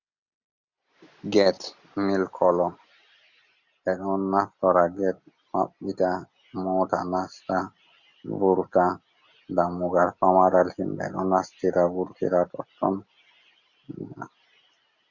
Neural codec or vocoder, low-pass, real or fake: none; 7.2 kHz; real